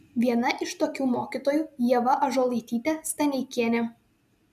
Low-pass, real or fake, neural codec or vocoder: 14.4 kHz; real; none